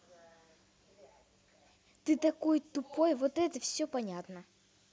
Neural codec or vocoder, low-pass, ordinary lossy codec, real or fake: none; none; none; real